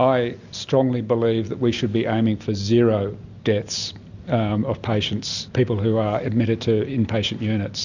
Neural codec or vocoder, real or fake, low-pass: none; real; 7.2 kHz